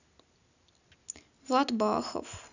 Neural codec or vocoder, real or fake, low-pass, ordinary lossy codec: none; real; 7.2 kHz; none